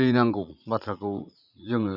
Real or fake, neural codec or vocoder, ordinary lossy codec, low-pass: fake; vocoder, 44.1 kHz, 80 mel bands, Vocos; none; 5.4 kHz